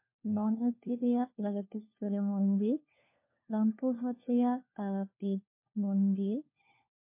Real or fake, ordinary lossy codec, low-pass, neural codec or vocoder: fake; MP3, 24 kbps; 3.6 kHz; codec, 16 kHz, 1 kbps, FunCodec, trained on LibriTTS, 50 frames a second